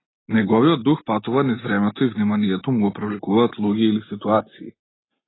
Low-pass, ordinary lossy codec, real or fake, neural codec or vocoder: 7.2 kHz; AAC, 16 kbps; real; none